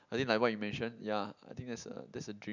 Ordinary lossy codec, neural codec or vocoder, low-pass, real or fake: none; none; 7.2 kHz; real